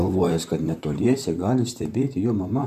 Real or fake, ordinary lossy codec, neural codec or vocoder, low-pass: fake; MP3, 96 kbps; vocoder, 44.1 kHz, 128 mel bands, Pupu-Vocoder; 14.4 kHz